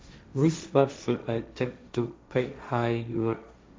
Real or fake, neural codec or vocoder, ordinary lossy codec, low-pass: fake; codec, 16 kHz, 1.1 kbps, Voila-Tokenizer; none; none